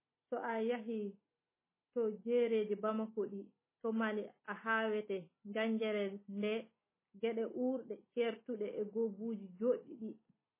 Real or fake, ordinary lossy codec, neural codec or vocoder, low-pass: real; MP3, 16 kbps; none; 3.6 kHz